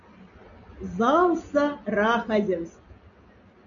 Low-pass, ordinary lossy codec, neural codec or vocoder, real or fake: 7.2 kHz; MP3, 96 kbps; none; real